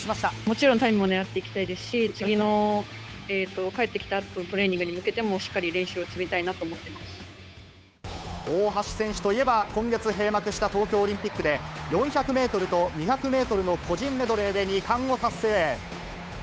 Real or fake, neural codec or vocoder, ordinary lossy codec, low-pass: fake; codec, 16 kHz, 8 kbps, FunCodec, trained on Chinese and English, 25 frames a second; none; none